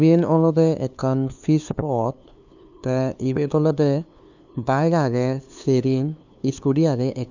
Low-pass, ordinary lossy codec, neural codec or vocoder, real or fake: 7.2 kHz; none; codec, 16 kHz, 2 kbps, FunCodec, trained on LibriTTS, 25 frames a second; fake